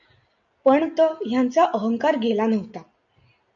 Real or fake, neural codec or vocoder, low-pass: real; none; 7.2 kHz